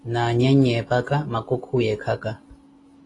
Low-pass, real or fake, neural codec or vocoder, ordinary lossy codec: 10.8 kHz; real; none; AAC, 32 kbps